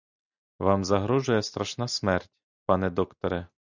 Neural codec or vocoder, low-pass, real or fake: none; 7.2 kHz; real